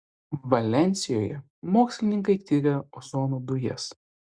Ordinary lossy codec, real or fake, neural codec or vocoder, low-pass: Opus, 64 kbps; real; none; 9.9 kHz